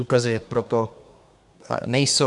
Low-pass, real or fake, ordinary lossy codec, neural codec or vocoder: 10.8 kHz; fake; MP3, 96 kbps; codec, 24 kHz, 1 kbps, SNAC